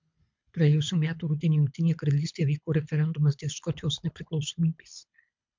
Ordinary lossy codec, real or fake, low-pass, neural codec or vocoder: MP3, 64 kbps; fake; 7.2 kHz; codec, 24 kHz, 6 kbps, HILCodec